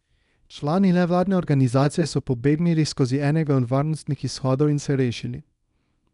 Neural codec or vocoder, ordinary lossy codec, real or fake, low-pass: codec, 24 kHz, 0.9 kbps, WavTokenizer, small release; none; fake; 10.8 kHz